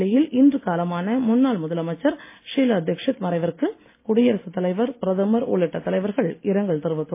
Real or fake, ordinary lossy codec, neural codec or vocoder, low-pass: real; MP3, 24 kbps; none; 3.6 kHz